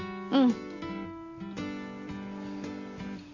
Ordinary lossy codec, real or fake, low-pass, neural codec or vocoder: none; real; 7.2 kHz; none